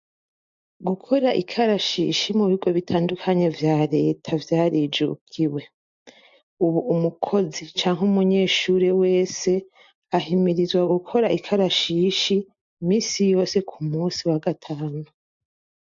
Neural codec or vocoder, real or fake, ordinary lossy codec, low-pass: none; real; MP3, 48 kbps; 7.2 kHz